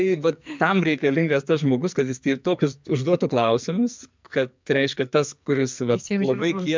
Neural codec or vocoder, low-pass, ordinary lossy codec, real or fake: codec, 44.1 kHz, 2.6 kbps, SNAC; 7.2 kHz; MP3, 64 kbps; fake